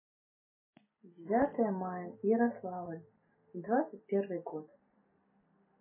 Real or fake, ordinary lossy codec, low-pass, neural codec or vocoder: real; MP3, 16 kbps; 3.6 kHz; none